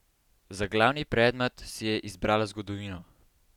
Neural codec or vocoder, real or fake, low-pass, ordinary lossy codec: none; real; 19.8 kHz; none